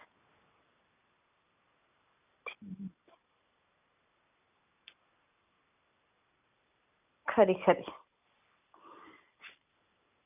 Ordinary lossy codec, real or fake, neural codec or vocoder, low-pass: none; real; none; 3.6 kHz